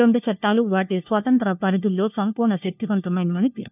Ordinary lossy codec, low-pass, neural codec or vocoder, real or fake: none; 3.6 kHz; codec, 16 kHz, 1 kbps, FunCodec, trained on LibriTTS, 50 frames a second; fake